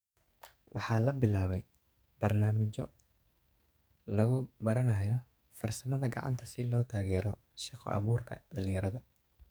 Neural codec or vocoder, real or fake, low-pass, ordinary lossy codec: codec, 44.1 kHz, 2.6 kbps, SNAC; fake; none; none